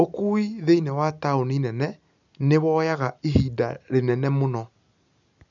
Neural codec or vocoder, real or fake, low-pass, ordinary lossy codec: none; real; 7.2 kHz; none